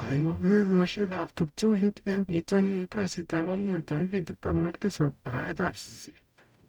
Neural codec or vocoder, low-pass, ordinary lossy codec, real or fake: codec, 44.1 kHz, 0.9 kbps, DAC; 19.8 kHz; none; fake